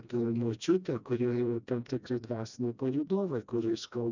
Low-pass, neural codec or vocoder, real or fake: 7.2 kHz; codec, 16 kHz, 1 kbps, FreqCodec, smaller model; fake